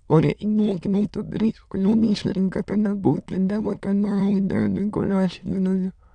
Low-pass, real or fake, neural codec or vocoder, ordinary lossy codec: 9.9 kHz; fake; autoencoder, 22.05 kHz, a latent of 192 numbers a frame, VITS, trained on many speakers; none